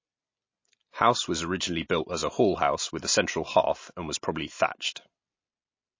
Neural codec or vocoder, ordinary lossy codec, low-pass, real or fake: none; MP3, 32 kbps; 7.2 kHz; real